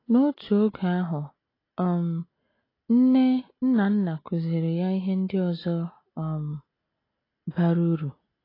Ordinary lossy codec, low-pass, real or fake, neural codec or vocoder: AAC, 24 kbps; 5.4 kHz; real; none